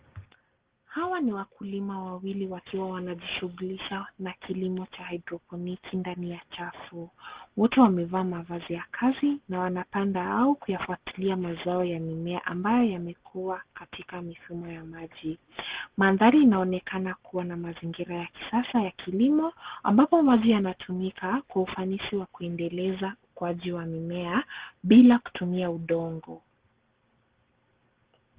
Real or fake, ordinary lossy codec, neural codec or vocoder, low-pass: real; Opus, 16 kbps; none; 3.6 kHz